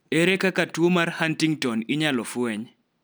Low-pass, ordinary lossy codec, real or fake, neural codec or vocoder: none; none; real; none